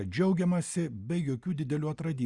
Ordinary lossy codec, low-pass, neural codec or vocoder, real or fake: Opus, 64 kbps; 10.8 kHz; none; real